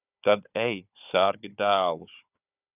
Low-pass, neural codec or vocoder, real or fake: 3.6 kHz; codec, 16 kHz, 4 kbps, FunCodec, trained on Chinese and English, 50 frames a second; fake